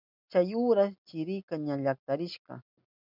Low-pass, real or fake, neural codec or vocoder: 5.4 kHz; real; none